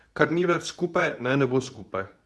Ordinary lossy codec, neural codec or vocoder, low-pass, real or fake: none; codec, 24 kHz, 0.9 kbps, WavTokenizer, medium speech release version 2; none; fake